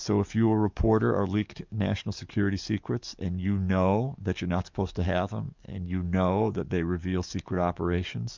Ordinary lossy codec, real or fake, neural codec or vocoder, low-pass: MP3, 64 kbps; fake; codec, 44.1 kHz, 7.8 kbps, DAC; 7.2 kHz